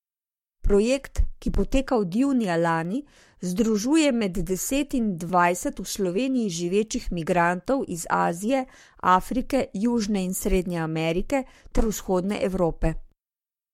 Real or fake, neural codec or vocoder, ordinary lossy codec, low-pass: fake; codec, 44.1 kHz, 7.8 kbps, Pupu-Codec; MP3, 64 kbps; 19.8 kHz